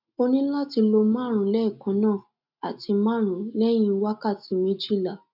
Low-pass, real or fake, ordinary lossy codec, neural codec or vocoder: 5.4 kHz; real; none; none